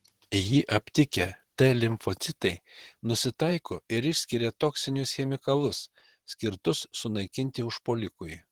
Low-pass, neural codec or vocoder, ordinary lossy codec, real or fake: 19.8 kHz; vocoder, 44.1 kHz, 128 mel bands, Pupu-Vocoder; Opus, 16 kbps; fake